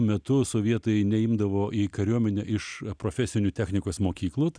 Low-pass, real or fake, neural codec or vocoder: 9.9 kHz; real; none